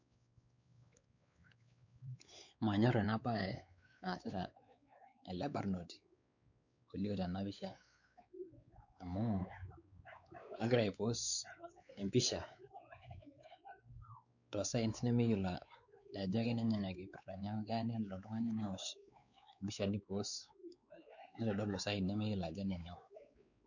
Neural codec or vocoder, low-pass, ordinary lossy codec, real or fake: codec, 16 kHz, 4 kbps, X-Codec, WavLM features, trained on Multilingual LibriSpeech; 7.2 kHz; none; fake